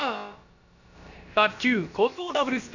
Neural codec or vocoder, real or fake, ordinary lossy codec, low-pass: codec, 16 kHz, about 1 kbps, DyCAST, with the encoder's durations; fake; none; 7.2 kHz